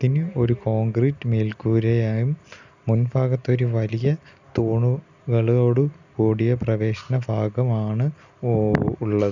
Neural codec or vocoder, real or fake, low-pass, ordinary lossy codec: none; real; 7.2 kHz; none